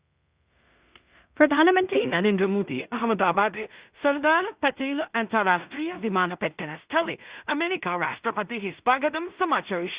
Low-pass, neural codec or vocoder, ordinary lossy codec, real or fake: 3.6 kHz; codec, 16 kHz in and 24 kHz out, 0.4 kbps, LongCat-Audio-Codec, two codebook decoder; Opus, 64 kbps; fake